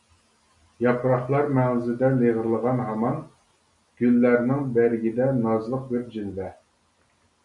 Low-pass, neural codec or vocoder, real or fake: 10.8 kHz; none; real